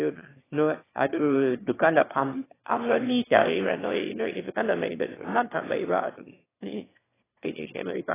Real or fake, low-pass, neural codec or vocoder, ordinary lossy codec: fake; 3.6 kHz; autoencoder, 22.05 kHz, a latent of 192 numbers a frame, VITS, trained on one speaker; AAC, 16 kbps